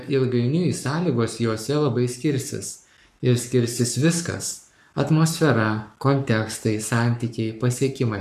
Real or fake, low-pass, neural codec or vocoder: fake; 14.4 kHz; codec, 44.1 kHz, 7.8 kbps, Pupu-Codec